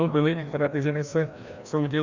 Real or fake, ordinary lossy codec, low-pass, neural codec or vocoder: fake; Opus, 64 kbps; 7.2 kHz; codec, 16 kHz, 1 kbps, FreqCodec, larger model